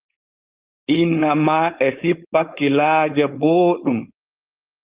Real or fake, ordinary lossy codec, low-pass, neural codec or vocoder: fake; Opus, 24 kbps; 3.6 kHz; codec, 16 kHz, 4.8 kbps, FACodec